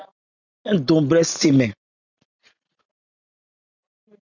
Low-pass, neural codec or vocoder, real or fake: 7.2 kHz; none; real